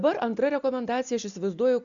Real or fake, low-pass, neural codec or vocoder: real; 7.2 kHz; none